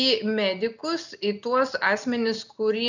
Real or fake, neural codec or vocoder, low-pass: real; none; 7.2 kHz